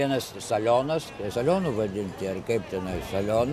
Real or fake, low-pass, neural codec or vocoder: real; 14.4 kHz; none